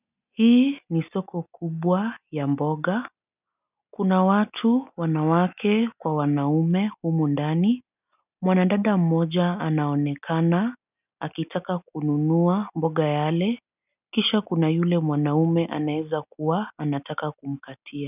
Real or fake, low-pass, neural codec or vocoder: real; 3.6 kHz; none